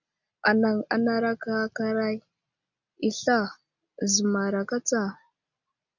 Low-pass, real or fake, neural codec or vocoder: 7.2 kHz; real; none